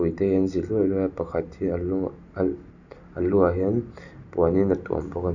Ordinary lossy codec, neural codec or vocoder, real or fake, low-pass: none; none; real; 7.2 kHz